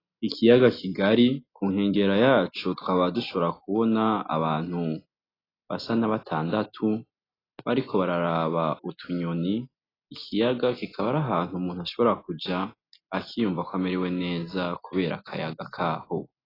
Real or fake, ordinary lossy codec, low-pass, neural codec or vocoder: real; AAC, 24 kbps; 5.4 kHz; none